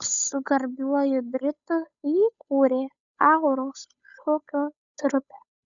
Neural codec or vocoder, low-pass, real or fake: codec, 16 kHz, 8 kbps, FunCodec, trained on Chinese and English, 25 frames a second; 7.2 kHz; fake